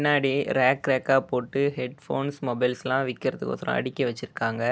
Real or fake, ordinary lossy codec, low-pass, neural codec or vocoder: real; none; none; none